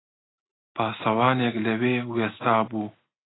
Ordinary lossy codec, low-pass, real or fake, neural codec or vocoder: AAC, 16 kbps; 7.2 kHz; real; none